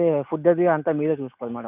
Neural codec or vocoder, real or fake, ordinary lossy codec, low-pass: none; real; none; 3.6 kHz